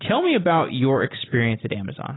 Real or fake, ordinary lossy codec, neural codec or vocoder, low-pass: real; AAC, 16 kbps; none; 7.2 kHz